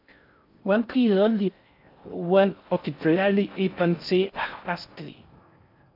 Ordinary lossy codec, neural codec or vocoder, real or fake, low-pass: AAC, 48 kbps; codec, 16 kHz in and 24 kHz out, 0.6 kbps, FocalCodec, streaming, 4096 codes; fake; 5.4 kHz